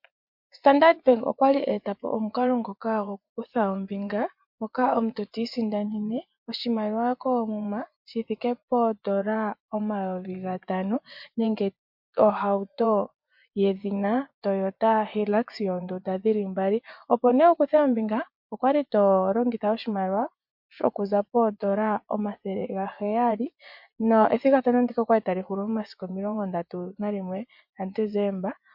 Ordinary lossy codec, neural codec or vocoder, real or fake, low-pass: MP3, 48 kbps; vocoder, 24 kHz, 100 mel bands, Vocos; fake; 5.4 kHz